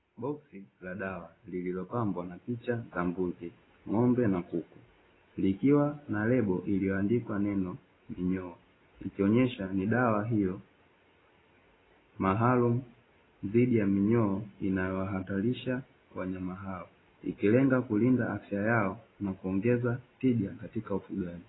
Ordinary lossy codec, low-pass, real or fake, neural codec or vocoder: AAC, 16 kbps; 7.2 kHz; real; none